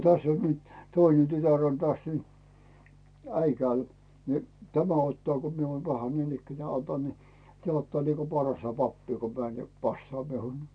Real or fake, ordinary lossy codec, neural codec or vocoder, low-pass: real; none; none; 9.9 kHz